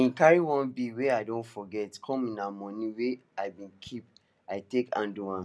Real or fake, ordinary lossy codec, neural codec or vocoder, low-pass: real; none; none; none